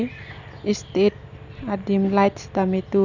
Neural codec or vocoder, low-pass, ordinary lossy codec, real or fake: none; 7.2 kHz; none; real